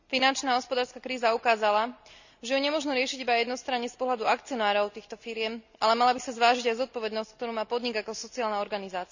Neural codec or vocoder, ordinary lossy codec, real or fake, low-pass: none; none; real; 7.2 kHz